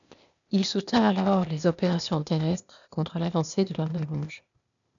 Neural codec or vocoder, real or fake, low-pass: codec, 16 kHz, 0.8 kbps, ZipCodec; fake; 7.2 kHz